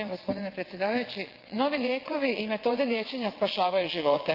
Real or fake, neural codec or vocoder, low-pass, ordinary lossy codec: fake; vocoder, 22.05 kHz, 80 mel bands, WaveNeXt; 5.4 kHz; Opus, 24 kbps